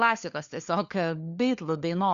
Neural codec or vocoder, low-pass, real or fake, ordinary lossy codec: codec, 16 kHz, 4 kbps, FunCodec, trained on LibriTTS, 50 frames a second; 7.2 kHz; fake; Opus, 64 kbps